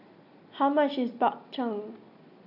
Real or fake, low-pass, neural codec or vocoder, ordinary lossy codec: real; 5.4 kHz; none; none